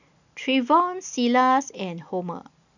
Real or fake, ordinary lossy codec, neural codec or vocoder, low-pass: real; none; none; 7.2 kHz